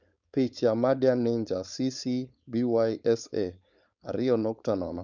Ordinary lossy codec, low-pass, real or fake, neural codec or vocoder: none; 7.2 kHz; fake; codec, 16 kHz, 4.8 kbps, FACodec